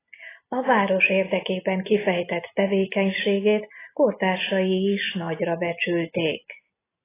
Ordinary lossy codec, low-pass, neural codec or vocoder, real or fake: AAC, 16 kbps; 3.6 kHz; none; real